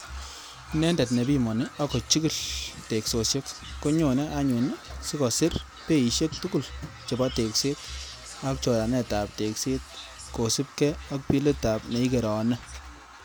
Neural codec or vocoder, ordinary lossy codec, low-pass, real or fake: none; none; none; real